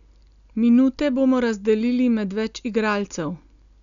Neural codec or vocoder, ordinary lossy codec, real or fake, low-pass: none; none; real; 7.2 kHz